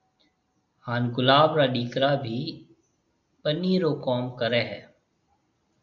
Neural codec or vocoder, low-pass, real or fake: none; 7.2 kHz; real